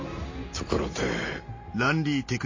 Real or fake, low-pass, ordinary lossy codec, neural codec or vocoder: real; 7.2 kHz; MP3, 32 kbps; none